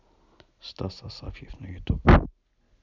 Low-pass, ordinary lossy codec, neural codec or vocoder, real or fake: 7.2 kHz; none; none; real